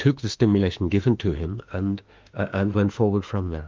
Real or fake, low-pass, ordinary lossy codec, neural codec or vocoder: fake; 7.2 kHz; Opus, 32 kbps; autoencoder, 48 kHz, 32 numbers a frame, DAC-VAE, trained on Japanese speech